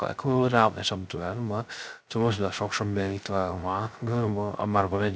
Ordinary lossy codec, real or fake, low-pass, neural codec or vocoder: none; fake; none; codec, 16 kHz, 0.3 kbps, FocalCodec